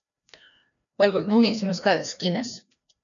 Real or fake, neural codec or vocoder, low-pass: fake; codec, 16 kHz, 1 kbps, FreqCodec, larger model; 7.2 kHz